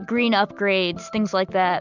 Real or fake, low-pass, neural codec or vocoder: real; 7.2 kHz; none